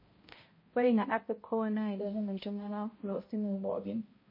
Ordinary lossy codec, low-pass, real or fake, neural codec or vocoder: MP3, 24 kbps; 5.4 kHz; fake; codec, 16 kHz, 0.5 kbps, X-Codec, HuBERT features, trained on balanced general audio